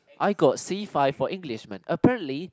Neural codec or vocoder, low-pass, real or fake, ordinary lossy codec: none; none; real; none